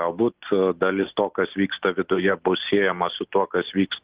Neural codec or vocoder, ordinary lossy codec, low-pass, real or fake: none; Opus, 16 kbps; 3.6 kHz; real